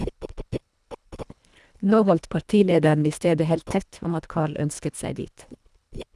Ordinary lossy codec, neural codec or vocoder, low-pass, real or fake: none; codec, 24 kHz, 1.5 kbps, HILCodec; none; fake